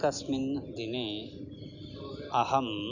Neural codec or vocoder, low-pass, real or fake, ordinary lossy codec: none; 7.2 kHz; real; none